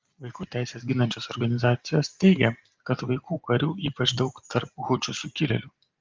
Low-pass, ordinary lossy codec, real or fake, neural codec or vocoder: 7.2 kHz; Opus, 32 kbps; real; none